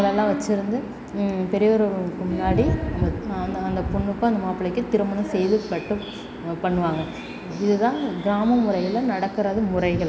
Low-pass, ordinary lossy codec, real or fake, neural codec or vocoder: none; none; real; none